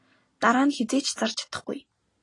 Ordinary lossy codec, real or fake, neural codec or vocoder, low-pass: AAC, 32 kbps; real; none; 10.8 kHz